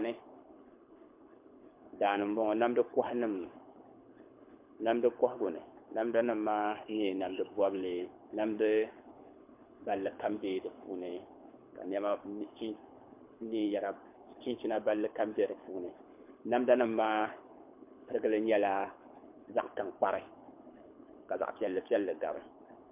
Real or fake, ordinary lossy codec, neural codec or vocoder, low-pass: fake; MP3, 32 kbps; codec, 24 kHz, 6 kbps, HILCodec; 3.6 kHz